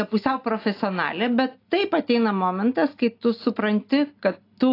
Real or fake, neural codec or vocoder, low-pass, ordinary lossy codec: real; none; 5.4 kHz; AAC, 32 kbps